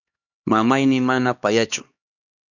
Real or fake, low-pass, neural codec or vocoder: fake; 7.2 kHz; codec, 16 kHz, 2 kbps, X-Codec, HuBERT features, trained on LibriSpeech